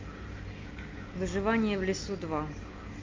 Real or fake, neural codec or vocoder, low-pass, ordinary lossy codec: real; none; 7.2 kHz; Opus, 24 kbps